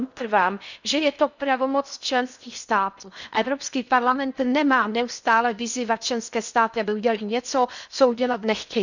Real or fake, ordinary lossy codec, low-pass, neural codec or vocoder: fake; none; 7.2 kHz; codec, 16 kHz in and 24 kHz out, 0.6 kbps, FocalCodec, streaming, 4096 codes